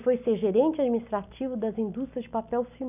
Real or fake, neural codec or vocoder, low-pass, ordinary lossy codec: real; none; 3.6 kHz; none